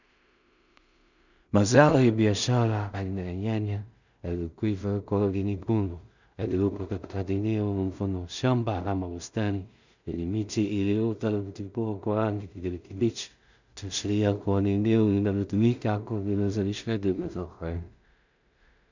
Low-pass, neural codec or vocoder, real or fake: 7.2 kHz; codec, 16 kHz in and 24 kHz out, 0.4 kbps, LongCat-Audio-Codec, two codebook decoder; fake